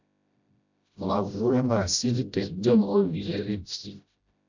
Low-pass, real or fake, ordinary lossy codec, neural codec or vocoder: 7.2 kHz; fake; MP3, 64 kbps; codec, 16 kHz, 0.5 kbps, FreqCodec, smaller model